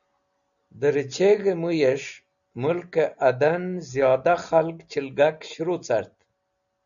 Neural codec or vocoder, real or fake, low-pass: none; real; 7.2 kHz